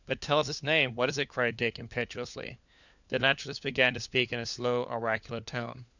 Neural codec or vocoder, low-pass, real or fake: codec, 16 kHz, 4 kbps, FunCodec, trained on LibriTTS, 50 frames a second; 7.2 kHz; fake